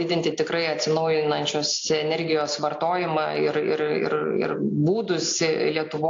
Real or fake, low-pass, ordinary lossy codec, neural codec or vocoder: real; 7.2 kHz; AAC, 48 kbps; none